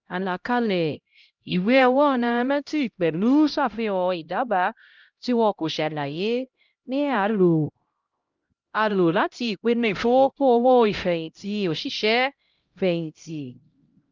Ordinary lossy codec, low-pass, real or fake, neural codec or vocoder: Opus, 24 kbps; 7.2 kHz; fake; codec, 16 kHz, 0.5 kbps, X-Codec, HuBERT features, trained on LibriSpeech